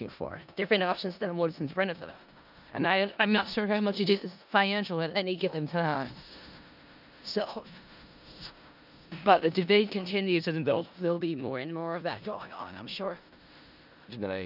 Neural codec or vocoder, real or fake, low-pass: codec, 16 kHz in and 24 kHz out, 0.4 kbps, LongCat-Audio-Codec, four codebook decoder; fake; 5.4 kHz